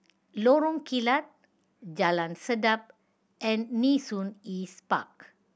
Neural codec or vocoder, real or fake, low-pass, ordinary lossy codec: none; real; none; none